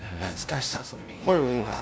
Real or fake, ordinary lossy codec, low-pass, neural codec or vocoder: fake; none; none; codec, 16 kHz, 0.5 kbps, FunCodec, trained on LibriTTS, 25 frames a second